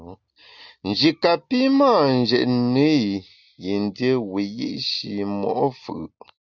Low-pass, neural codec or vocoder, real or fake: 7.2 kHz; none; real